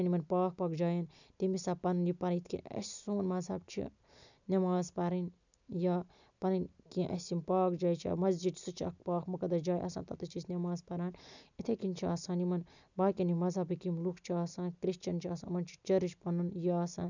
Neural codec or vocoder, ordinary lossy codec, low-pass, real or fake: none; none; 7.2 kHz; real